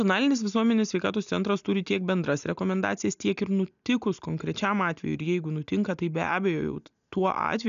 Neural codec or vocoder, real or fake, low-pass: none; real; 7.2 kHz